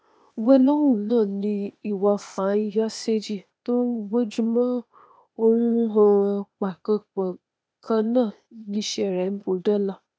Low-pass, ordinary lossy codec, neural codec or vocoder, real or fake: none; none; codec, 16 kHz, 0.8 kbps, ZipCodec; fake